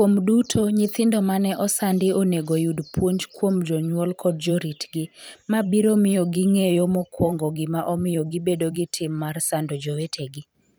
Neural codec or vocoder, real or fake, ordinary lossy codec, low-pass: vocoder, 44.1 kHz, 128 mel bands every 512 samples, BigVGAN v2; fake; none; none